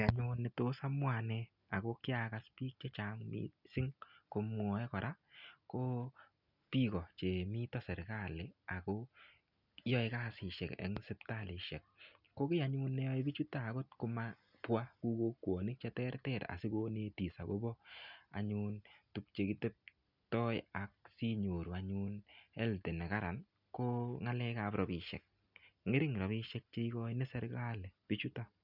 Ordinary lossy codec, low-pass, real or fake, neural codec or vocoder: none; 5.4 kHz; real; none